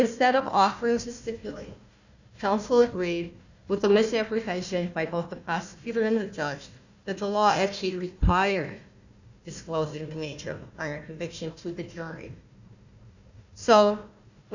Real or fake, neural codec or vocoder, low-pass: fake; codec, 16 kHz, 1 kbps, FunCodec, trained on Chinese and English, 50 frames a second; 7.2 kHz